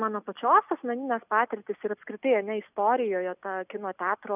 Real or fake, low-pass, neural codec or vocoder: real; 3.6 kHz; none